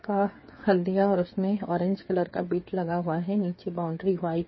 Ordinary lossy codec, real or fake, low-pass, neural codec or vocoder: MP3, 24 kbps; fake; 7.2 kHz; codec, 16 kHz, 8 kbps, FreqCodec, smaller model